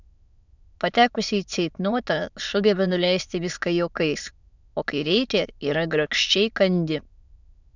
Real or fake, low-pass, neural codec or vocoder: fake; 7.2 kHz; autoencoder, 22.05 kHz, a latent of 192 numbers a frame, VITS, trained on many speakers